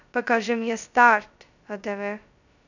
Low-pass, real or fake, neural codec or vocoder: 7.2 kHz; fake; codec, 16 kHz, 0.2 kbps, FocalCodec